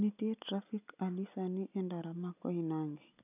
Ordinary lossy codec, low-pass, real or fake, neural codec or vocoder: none; 3.6 kHz; real; none